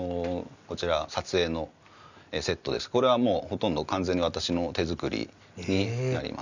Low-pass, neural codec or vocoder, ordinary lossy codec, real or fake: 7.2 kHz; none; none; real